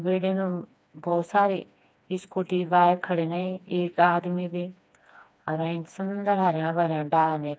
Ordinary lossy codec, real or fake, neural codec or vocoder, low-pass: none; fake; codec, 16 kHz, 2 kbps, FreqCodec, smaller model; none